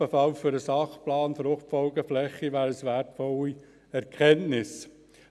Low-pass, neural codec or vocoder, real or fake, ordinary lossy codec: none; none; real; none